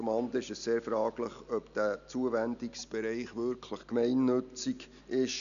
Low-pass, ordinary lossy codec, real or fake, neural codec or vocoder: 7.2 kHz; none; real; none